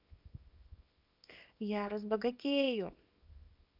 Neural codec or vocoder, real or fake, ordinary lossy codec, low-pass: codec, 24 kHz, 0.9 kbps, WavTokenizer, small release; fake; none; 5.4 kHz